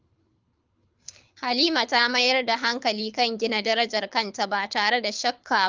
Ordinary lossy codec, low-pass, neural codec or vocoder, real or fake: Opus, 24 kbps; 7.2 kHz; codec, 24 kHz, 6 kbps, HILCodec; fake